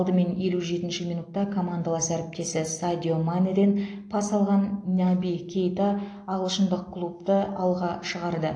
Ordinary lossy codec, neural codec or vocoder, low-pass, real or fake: AAC, 48 kbps; none; 9.9 kHz; real